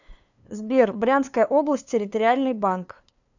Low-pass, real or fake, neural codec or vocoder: 7.2 kHz; fake; codec, 16 kHz, 2 kbps, FunCodec, trained on LibriTTS, 25 frames a second